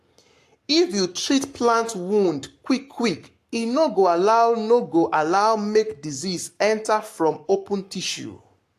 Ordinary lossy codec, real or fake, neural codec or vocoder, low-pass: AAC, 64 kbps; fake; codec, 44.1 kHz, 7.8 kbps, Pupu-Codec; 14.4 kHz